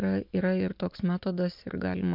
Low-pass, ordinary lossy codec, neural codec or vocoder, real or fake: 5.4 kHz; MP3, 48 kbps; none; real